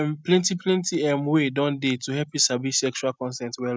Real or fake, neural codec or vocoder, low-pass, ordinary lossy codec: real; none; none; none